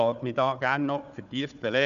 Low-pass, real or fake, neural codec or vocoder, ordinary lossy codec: 7.2 kHz; fake; codec, 16 kHz, 4 kbps, FunCodec, trained on Chinese and English, 50 frames a second; none